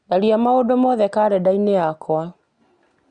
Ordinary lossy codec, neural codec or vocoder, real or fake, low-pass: Opus, 64 kbps; none; real; 9.9 kHz